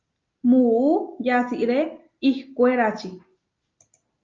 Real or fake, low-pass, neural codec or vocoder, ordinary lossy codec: real; 7.2 kHz; none; Opus, 24 kbps